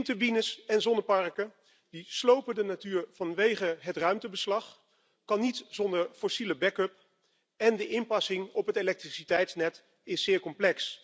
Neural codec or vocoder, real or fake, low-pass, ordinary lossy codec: none; real; none; none